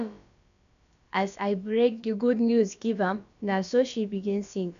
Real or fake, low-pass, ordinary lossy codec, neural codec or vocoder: fake; 7.2 kHz; none; codec, 16 kHz, about 1 kbps, DyCAST, with the encoder's durations